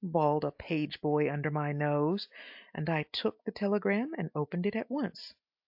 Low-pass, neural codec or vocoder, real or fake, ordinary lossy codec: 5.4 kHz; none; real; AAC, 48 kbps